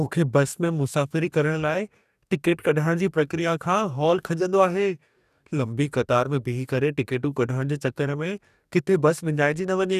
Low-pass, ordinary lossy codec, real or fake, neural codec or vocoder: 14.4 kHz; none; fake; codec, 44.1 kHz, 2.6 kbps, DAC